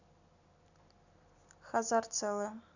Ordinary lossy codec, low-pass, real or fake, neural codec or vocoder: none; 7.2 kHz; real; none